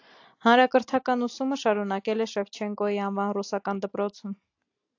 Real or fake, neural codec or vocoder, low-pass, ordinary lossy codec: real; none; 7.2 kHz; AAC, 48 kbps